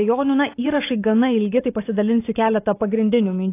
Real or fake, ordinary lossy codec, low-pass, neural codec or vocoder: fake; AAC, 24 kbps; 3.6 kHz; vocoder, 44.1 kHz, 128 mel bands every 512 samples, BigVGAN v2